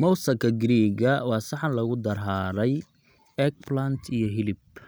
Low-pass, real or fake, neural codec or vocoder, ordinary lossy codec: none; real; none; none